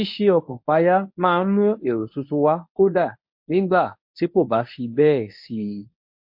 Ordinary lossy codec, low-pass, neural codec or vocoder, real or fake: MP3, 32 kbps; 5.4 kHz; codec, 24 kHz, 0.9 kbps, WavTokenizer, medium speech release version 1; fake